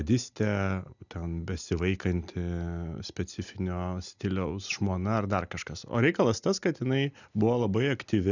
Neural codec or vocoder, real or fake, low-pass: none; real; 7.2 kHz